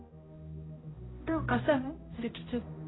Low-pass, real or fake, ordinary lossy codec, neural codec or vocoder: 7.2 kHz; fake; AAC, 16 kbps; codec, 16 kHz, 0.5 kbps, X-Codec, HuBERT features, trained on balanced general audio